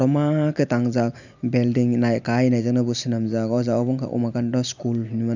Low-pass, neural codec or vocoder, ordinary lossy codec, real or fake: 7.2 kHz; none; none; real